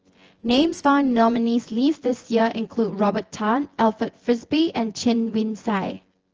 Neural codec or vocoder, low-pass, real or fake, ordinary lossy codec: vocoder, 24 kHz, 100 mel bands, Vocos; 7.2 kHz; fake; Opus, 16 kbps